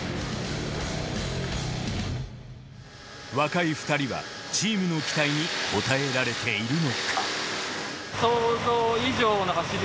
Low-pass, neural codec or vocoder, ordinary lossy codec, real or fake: none; none; none; real